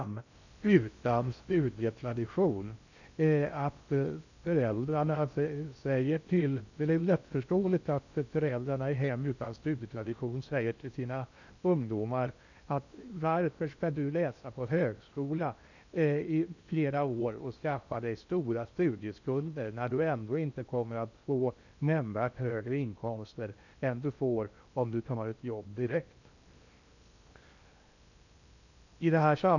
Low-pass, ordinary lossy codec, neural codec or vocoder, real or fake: 7.2 kHz; none; codec, 16 kHz in and 24 kHz out, 0.6 kbps, FocalCodec, streaming, 4096 codes; fake